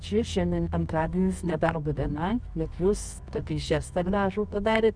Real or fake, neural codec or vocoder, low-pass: fake; codec, 24 kHz, 0.9 kbps, WavTokenizer, medium music audio release; 9.9 kHz